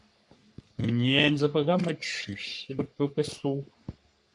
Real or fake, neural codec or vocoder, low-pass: fake; codec, 44.1 kHz, 3.4 kbps, Pupu-Codec; 10.8 kHz